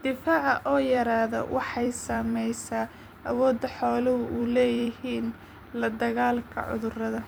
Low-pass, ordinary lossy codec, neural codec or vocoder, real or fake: none; none; vocoder, 44.1 kHz, 128 mel bands every 256 samples, BigVGAN v2; fake